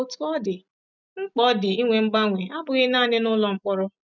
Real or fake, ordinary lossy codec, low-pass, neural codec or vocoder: real; none; 7.2 kHz; none